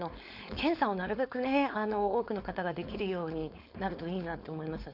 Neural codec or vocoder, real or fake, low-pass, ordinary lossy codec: codec, 16 kHz, 4.8 kbps, FACodec; fake; 5.4 kHz; none